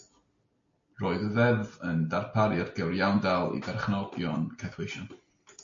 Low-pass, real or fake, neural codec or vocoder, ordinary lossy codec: 7.2 kHz; real; none; MP3, 48 kbps